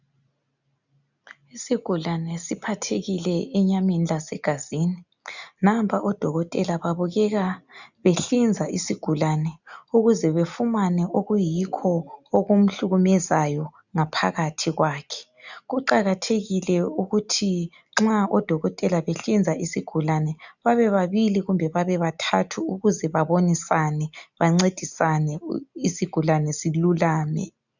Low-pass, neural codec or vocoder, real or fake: 7.2 kHz; none; real